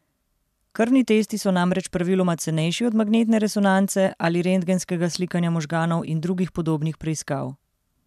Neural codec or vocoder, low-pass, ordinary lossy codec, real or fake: none; 14.4 kHz; MP3, 96 kbps; real